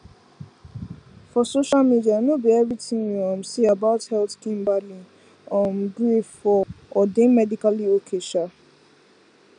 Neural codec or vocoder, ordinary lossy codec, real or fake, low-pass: none; none; real; 9.9 kHz